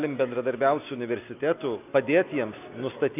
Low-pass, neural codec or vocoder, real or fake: 3.6 kHz; none; real